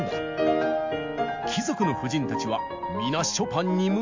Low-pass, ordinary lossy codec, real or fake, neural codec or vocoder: 7.2 kHz; MP3, 64 kbps; real; none